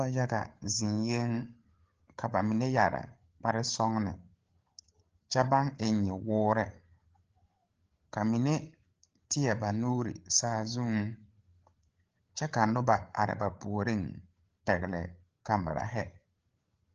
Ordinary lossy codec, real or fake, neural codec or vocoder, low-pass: Opus, 16 kbps; fake; codec, 16 kHz, 8 kbps, FreqCodec, larger model; 7.2 kHz